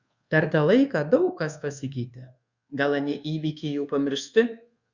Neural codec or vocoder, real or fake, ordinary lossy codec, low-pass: codec, 24 kHz, 1.2 kbps, DualCodec; fake; Opus, 64 kbps; 7.2 kHz